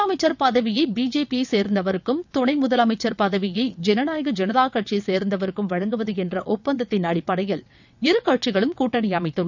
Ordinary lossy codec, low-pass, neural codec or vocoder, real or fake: none; 7.2 kHz; vocoder, 22.05 kHz, 80 mel bands, WaveNeXt; fake